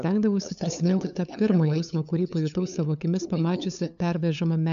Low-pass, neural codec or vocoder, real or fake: 7.2 kHz; codec, 16 kHz, 8 kbps, FunCodec, trained on LibriTTS, 25 frames a second; fake